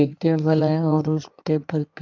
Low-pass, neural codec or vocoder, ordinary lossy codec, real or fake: 7.2 kHz; codec, 16 kHz in and 24 kHz out, 1.1 kbps, FireRedTTS-2 codec; none; fake